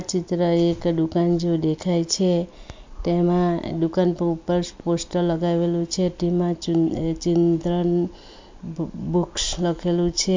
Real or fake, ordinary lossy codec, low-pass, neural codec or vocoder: real; MP3, 64 kbps; 7.2 kHz; none